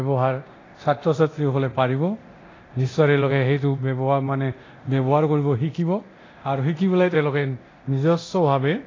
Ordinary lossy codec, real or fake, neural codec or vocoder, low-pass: AAC, 32 kbps; fake; codec, 24 kHz, 0.9 kbps, DualCodec; 7.2 kHz